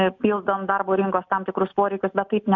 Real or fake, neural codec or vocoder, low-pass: real; none; 7.2 kHz